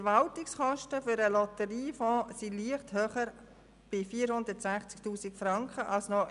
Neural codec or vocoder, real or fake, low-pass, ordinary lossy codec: none; real; 10.8 kHz; none